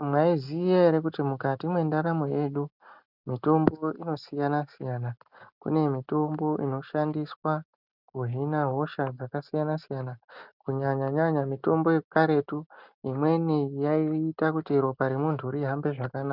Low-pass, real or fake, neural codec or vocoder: 5.4 kHz; real; none